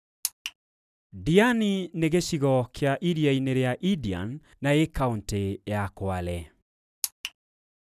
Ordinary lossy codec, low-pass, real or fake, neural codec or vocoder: none; 14.4 kHz; real; none